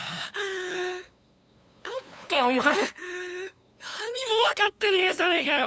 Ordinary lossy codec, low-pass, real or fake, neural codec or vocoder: none; none; fake; codec, 16 kHz, 2 kbps, FunCodec, trained on LibriTTS, 25 frames a second